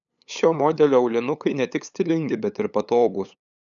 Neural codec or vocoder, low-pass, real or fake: codec, 16 kHz, 8 kbps, FunCodec, trained on LibriTTS, 25 frames a second; 7.2 kHz; fake